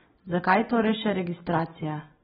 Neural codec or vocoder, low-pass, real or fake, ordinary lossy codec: none; 10.8 kHz; real; AAC, 16 kbps